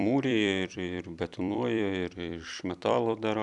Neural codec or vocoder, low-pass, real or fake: vocoder, 44.1 kHz, 128 mel bands every 256 samples, BigVGAN v2; 10.8 kHz; fake